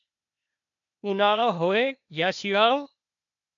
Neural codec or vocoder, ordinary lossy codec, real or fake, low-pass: codec, 16 kHz, 0.8 kbps, ZipCodec; MP3, 64 kbps; fake; 7.2 kHz